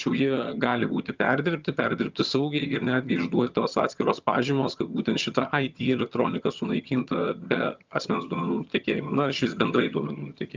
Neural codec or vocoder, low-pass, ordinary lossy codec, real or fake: vocoder, 22.05 kHz, 80 mel bands, HiFi-GAN; 7.2 kHz; Opus, 24 kbps; fake